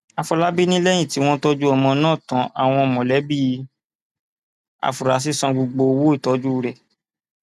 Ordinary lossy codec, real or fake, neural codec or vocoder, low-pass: none; real; none; 14.4 kHz